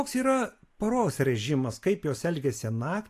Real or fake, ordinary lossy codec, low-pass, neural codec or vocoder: real; AAC, 64 kbps; 14.4 kHz; none